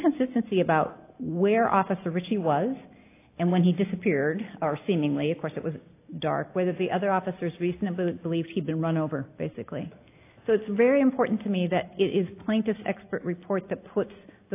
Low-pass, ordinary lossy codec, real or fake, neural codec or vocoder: 3.6 kHz; AAC, 24 kbps; real; none